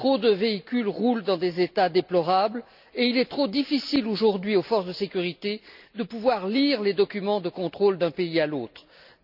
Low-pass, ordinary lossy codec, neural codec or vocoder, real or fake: 5.4 kHz; none; none; real